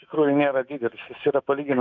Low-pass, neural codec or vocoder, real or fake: 7.2 kHz; autoencoder, 48 kHz, 128 numbers a frame, DAC-VAE, trained on Japanese speech; fake